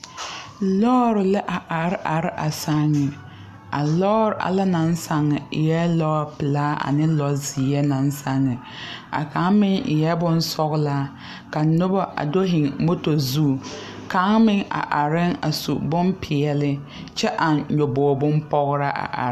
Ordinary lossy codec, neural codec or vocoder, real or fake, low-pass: MP3, 96 kbps; none; real; 14.4 kHz